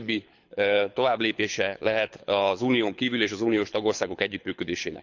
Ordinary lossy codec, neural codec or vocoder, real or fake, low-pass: none; codec, 24 kHz, 6 kbps, HILCodec; fake; 7.2 kHz